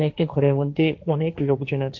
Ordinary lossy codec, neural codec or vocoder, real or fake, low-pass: AAC, 48 kbps; codec, 16 kHz, 1.1 kbps, Voila-Tokenizer; fake; 7.2 kHz